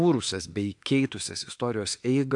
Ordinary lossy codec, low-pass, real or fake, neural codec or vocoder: AAC, 64 kbps; 10.8 kHz; fake; autoencoder, 48 kHz, 32 numbers a frame, DAC-VAE, trained on Japanese speech